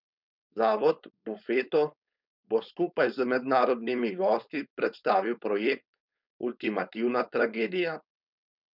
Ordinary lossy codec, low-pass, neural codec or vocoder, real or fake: none; 5.4 kHz; codec, 16 kHz, 4.8 kbps, FACodec; fake